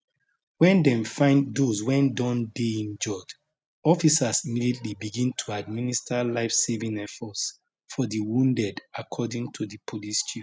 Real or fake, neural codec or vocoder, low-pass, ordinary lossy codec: real; none; none; none